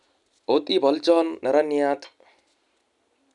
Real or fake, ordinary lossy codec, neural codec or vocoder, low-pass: real; none; none; 10.8 kHz